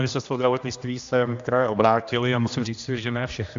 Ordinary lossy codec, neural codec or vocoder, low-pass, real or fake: AAC, 64 kbps; codec, 16 kHz, 1 kbps, X-Codec, HuBERT features, trained on general audio; 7.2 kHz; fake